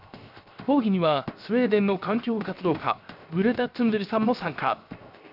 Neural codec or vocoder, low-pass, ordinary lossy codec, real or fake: codec, 16 kHz, 0.7 kbps, FocalCodec; 5.4 kHz; AAC, 48 kbps; fake